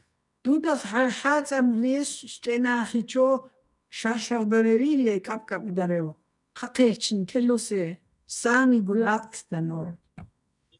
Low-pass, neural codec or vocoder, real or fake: 10.8 kHz; codec, 24 kHz, 0.9 kbps, WavTokenizer, medium music audio release; fake